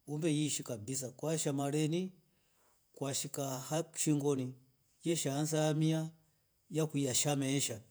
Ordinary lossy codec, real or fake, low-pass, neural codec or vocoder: none; fake; none; vocoder, 48 kHz, 128 mel bands, Vocos